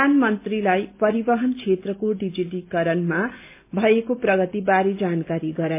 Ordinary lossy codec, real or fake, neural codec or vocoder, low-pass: MP3, 32 kbps; real; none; 3.6 kHz